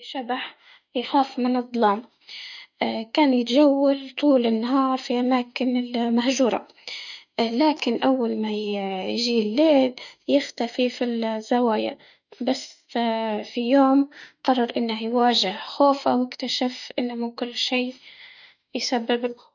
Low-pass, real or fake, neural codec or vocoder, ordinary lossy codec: 7.2 kHz; fake; autoencoder, 48 kHz, 32 numbers a frame, DAC-VAE, trained on Japanese speech; none